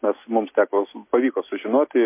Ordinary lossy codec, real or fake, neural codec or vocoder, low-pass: MP3, 24 kbps; real; none; 3.6 kHz